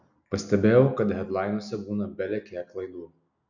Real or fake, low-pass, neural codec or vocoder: real; 7.2 kHz; none